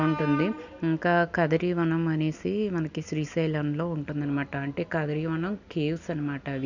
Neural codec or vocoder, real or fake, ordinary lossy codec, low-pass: none; real; none; 7.2 kHz